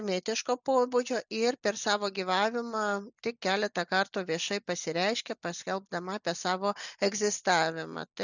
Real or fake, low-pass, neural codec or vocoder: real; 7.2 kHz; none